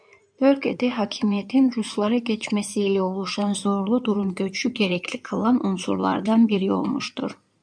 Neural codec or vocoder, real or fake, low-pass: codec, 16 kHz in and 24 kHz out, 2.2 kbps, FireRedTTS-2 codec; fake; 9.9 kHz